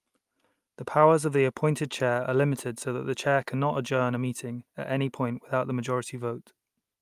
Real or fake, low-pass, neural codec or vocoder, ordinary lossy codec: real; 14.4 kHz; none; Opus, 32 kbps